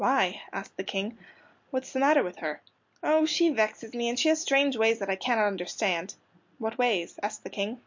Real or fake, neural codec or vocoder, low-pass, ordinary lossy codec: real; none; 7.2 kHz; MP3, 48 kbps